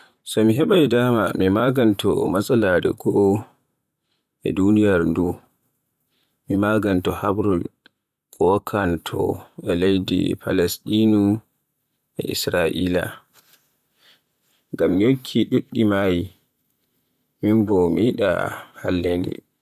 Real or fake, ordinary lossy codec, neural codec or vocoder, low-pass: fake; none; vocoder, 44.1 kHz, 128 mel bands, Pupu-Vocoder; 14.4 kHz